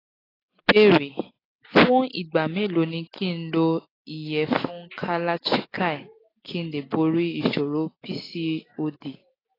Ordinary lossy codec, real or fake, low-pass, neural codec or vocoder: AAC, 24 kbps; real; 5.4 kHz; none